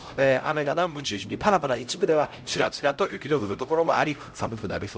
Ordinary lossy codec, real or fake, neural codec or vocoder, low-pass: none; fake; codec, 16 kHz, 0.5 kbps, X-Codec, HuBERT features, trained on LibriSpeech; none